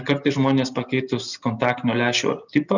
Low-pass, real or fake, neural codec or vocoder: 7.2 kHz; real; none